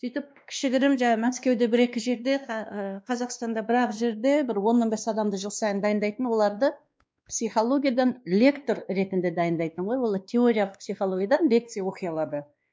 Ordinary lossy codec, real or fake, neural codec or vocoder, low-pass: none; fake; codec, 16 kHz, 2 kbps, X-Codec, WavLM features, trained on Multilingual LibriSpeech; none